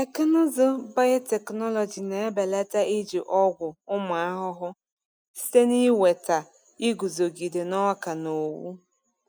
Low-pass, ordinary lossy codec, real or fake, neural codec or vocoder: none; none; real; none